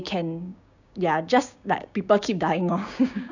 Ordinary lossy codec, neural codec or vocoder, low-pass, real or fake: none; codec, 16 kHz, 6 kbps, DAC; 7.2 kHz; fake